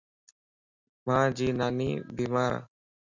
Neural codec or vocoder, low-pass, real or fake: none; 7.2 kHz; real